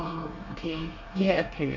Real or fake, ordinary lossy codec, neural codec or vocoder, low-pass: fake; none; codec, 24 kHz, 1 kbps, SNAC; 7.2 kHz